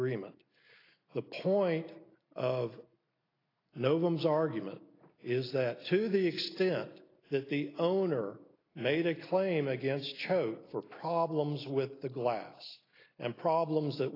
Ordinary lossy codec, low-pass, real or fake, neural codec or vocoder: AAC, 24 kbps; 5.4 kHz; real; none